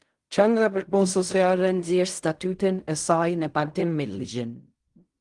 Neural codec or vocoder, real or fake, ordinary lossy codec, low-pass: codec, 16 kHz in and 24 kHz out, 0.4 kbps, LongCat-Audio-Codec, fine tuned four codebook decoder; fake; Opus, 24 kbps; 10.8 kHz